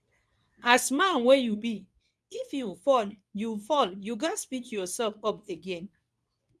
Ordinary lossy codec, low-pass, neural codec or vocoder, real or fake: none; none; codec, 24 kHz, 0.9 kbps, WavTokenizer, medium speech release version 2; fake